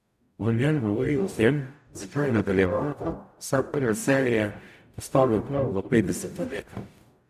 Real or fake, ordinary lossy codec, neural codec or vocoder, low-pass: fake; none; codec, 44.1 kHz, 0.9 kbps, DAC; 14.4 kHz